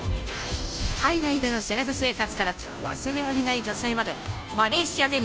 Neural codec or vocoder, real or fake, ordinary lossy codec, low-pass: codec, 16 kHz, 0.5 kbps, FunCodec, trained on Chinese and English, 25 frames a second; fake; none; none